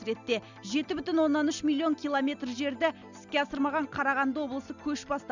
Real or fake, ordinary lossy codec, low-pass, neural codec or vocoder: real; none; 7.2 kHz; none